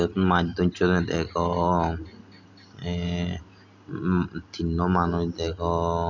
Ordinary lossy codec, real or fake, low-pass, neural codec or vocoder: none; real; 7.2 kHz; none